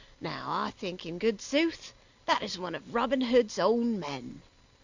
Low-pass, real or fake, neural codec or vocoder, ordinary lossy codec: 7.2 kHz; real; none; Opus, 64 kbps